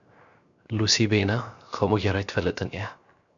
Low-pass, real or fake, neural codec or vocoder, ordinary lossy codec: 7.2 kHz; fake; codec, 16 kHz, 0.7 kbps, FocalCodec; MP3, 64 kbps